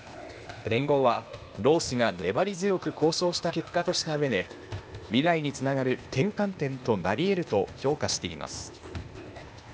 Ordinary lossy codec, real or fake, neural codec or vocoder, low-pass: none; fake; codec, 16 kHz, 0.8 kbps, ZipCodec; none